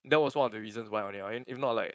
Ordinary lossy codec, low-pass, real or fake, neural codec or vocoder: none; none; fake; codec, 16 kHz, 4.8 kbps, FACodec